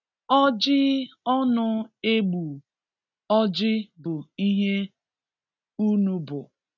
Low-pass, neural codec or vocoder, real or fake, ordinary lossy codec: 7.2 kHz; none; real; none